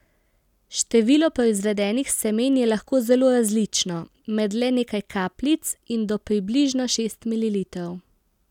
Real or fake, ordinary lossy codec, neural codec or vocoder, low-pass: real; none; none; 19.8 kHz